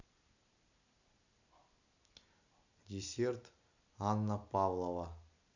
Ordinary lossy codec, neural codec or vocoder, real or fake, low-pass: none; none; real; 7.2 kHz